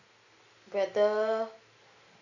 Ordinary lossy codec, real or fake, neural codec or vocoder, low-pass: none; real; none; 7.2 kHz